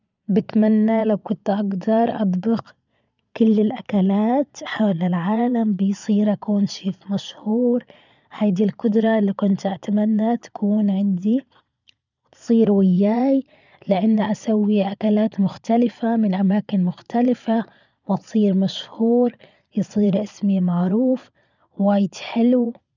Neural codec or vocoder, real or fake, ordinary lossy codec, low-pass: vocoder, 22.05 kHz, 80 mel bands, Vocos; fake; none; 7.2 kHz